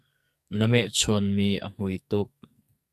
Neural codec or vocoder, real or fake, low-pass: codec, 44.1 kHz, 2.6 kbps, SNAC; fake; 14.4 kHz